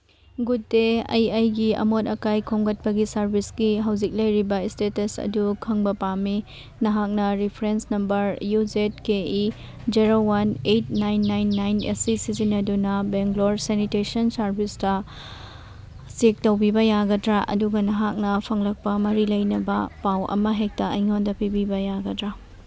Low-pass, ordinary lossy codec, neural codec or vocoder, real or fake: none; none; none; real